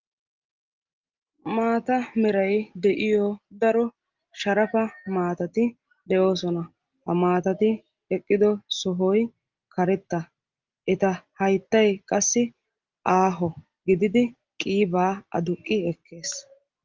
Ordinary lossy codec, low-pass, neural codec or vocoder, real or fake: Opus, 24 kbps; 7.2 kHz; none; real